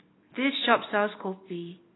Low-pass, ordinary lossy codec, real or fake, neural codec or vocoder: 7.2 kHz; AAC, 16 kbps; real; none